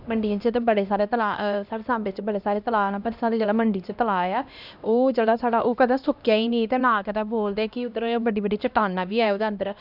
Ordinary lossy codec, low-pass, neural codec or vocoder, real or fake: none; 5.4 kHz; codec, 16 kHz, 1 kbps, X-Codec, HuBERT features, trained on LibriSpeech; fake